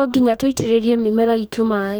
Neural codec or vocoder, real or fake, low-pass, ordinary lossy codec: codec, 44.1 kHz, 2.6 kbps, DAC; fake; none; none